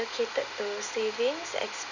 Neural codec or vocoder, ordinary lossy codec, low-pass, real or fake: none; none; 7.2 kHz; real